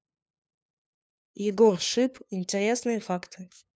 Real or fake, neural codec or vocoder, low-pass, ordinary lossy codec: fake; codec, 16 kHz, 2 kbps, FunCodec, trained on LibriTTS, 25 frames a second; none; none